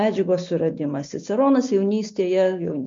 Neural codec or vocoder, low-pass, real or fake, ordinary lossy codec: none; 7.2 kHz; real; MP3, 48 kbps